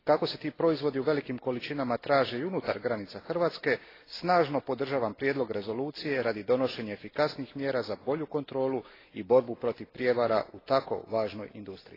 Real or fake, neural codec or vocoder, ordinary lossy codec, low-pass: real; none; AAC, 24 kbps; 5.4 kHz